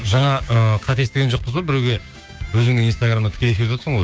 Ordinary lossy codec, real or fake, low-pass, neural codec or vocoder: none; fake; none; codec, 16 kHz, 6 kbps, DAC